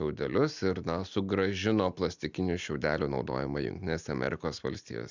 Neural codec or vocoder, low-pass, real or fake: none; 7.2 kHz; real